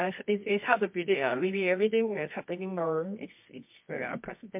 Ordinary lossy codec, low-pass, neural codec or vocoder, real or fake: MP3, 32 kbps; 3.6 kHz; codec, 24 kHz, 0.9 kbps, WavTokenizer, medium music audio release; fake